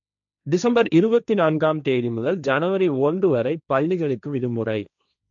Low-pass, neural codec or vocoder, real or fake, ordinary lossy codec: 7.2 kHz; codec, 16 kHz, 1.1 kbps, Voila-Tokenizer; fake; none